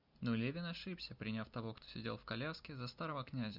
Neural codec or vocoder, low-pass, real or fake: none; 5.4 kHz; real